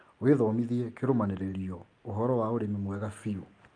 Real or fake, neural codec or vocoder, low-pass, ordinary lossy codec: real; none; 9.9 kHz; Opus, 32 kbps